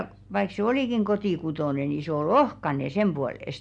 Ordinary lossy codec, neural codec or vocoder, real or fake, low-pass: none; none; real; 9.9 kHz